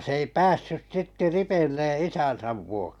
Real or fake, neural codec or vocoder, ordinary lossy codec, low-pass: real; none; none; 19.8 kHz